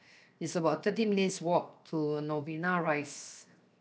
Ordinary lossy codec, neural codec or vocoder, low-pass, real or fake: none; codec, 16 kHz, 0.7 kbps, FocalCodec; none; fake